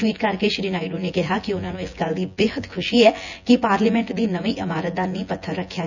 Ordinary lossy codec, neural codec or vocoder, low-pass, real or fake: none; vocoder, 24 kHz, 100 mel bands, Vocos; 7.2 kHz; fake